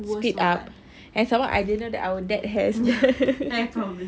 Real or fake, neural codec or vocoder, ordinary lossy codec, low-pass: real; none; none; none